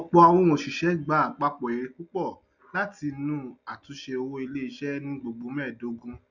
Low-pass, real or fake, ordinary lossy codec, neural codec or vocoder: 7.2 kHz; real; none; none